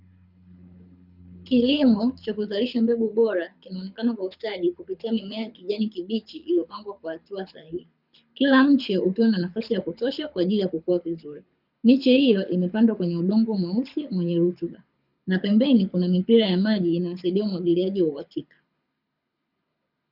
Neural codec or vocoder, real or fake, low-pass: codec, 24 kHz, 6 kbps, HILCodec; fake; 5.4 kHz